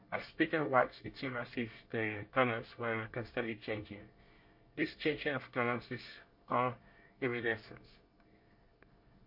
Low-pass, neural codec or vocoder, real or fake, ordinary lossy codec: 5.4 kHz; codec, 24 kHz, 1 kbps, SNAC; fake; MP3, 32 kbps